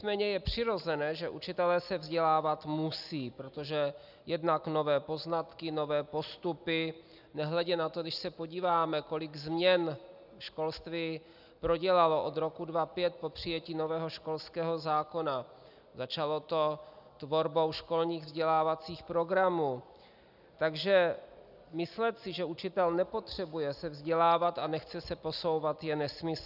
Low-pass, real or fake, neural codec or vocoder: 5.4 kHz; real; none